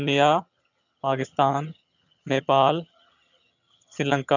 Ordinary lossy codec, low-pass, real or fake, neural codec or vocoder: none; 7.2 kHz; fake; vocoder, 22.05 kHz, 80 mel bands, HiFi-GAN